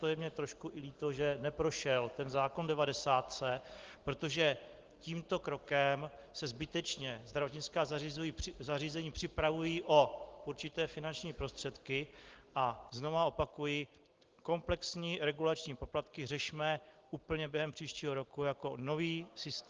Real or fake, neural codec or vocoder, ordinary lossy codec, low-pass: real; none; Opus, 16 kbps; 7.2 kHz